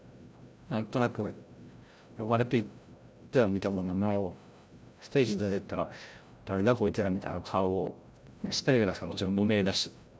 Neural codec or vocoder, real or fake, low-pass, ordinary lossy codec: codec, 16 kHz, 0.5 kbps, FreqCodec, larger model; fake; none; none